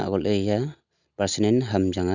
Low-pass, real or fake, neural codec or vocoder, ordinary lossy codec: 7.2 kHz; real; none; none